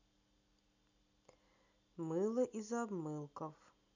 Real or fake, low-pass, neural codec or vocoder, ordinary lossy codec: real; 7.2 kHz; none; none